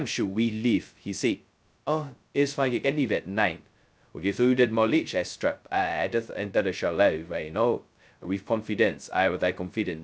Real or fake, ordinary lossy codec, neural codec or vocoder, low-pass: fake; none; codec, 16 kHz, 0.2 kbps, FocalCodec; none